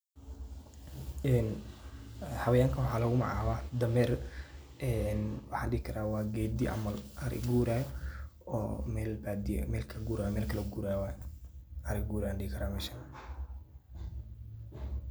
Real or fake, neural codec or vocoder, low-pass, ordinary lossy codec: real; none; none; none